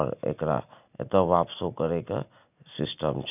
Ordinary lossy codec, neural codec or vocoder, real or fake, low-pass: none; none; real; 3.6 kHz